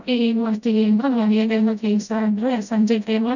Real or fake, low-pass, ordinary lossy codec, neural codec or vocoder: fake; 7.2 kHz; none; codec, 16 kHz, 0.5 kbps, FreqCodec, smaller model